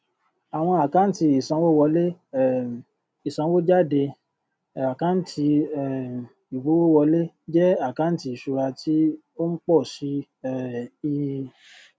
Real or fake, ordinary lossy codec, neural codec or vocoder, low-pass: real; none; none; none